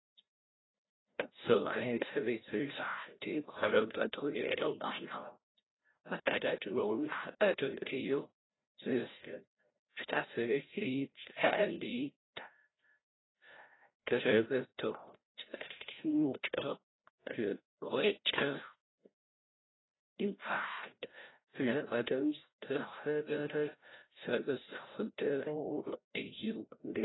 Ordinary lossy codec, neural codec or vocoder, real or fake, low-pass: AAC, 16 kbps; codec, 16 kHz, 0.5 kbps, FreqCodec, larger model; fake; 7.2 kHz